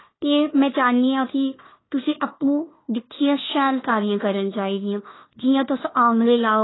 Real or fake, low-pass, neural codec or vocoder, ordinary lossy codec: fake; 7.2 kHz; codec, 16 kHz, 1 kbps, FunCodec, trained on Chinese and English, 50 frames a second; AAC, 16 kbps